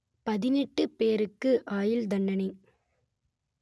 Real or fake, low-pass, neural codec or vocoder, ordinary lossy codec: real; none; none; none